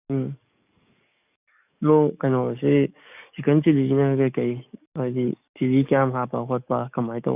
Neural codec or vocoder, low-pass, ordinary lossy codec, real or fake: codec, 44.1 kHz, 7.8 kbps, DAC; 3.6 kHz; none; fake